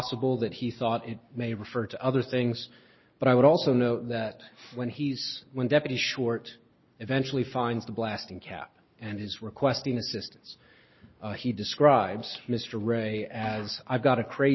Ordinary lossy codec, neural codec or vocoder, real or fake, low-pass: MP3, 24 kbps; none; real; 7.2 kHz